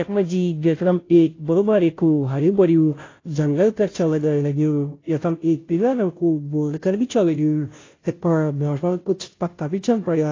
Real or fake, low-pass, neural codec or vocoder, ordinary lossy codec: fake; 7.2 kHz; codec, 16 kHz, 0.5 kbps, FunCodec, trained on Chinese and English, 25 frames a second; AAC, 32 kbps